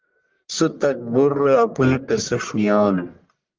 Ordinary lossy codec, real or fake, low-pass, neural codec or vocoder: Opus, 24 kbps; fake; 7.2 kHz; codec, 44.1 kHz, 1.7 kbps, Pupu-Codec